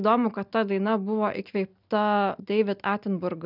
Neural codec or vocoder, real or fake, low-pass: none; real; 5.4 kHz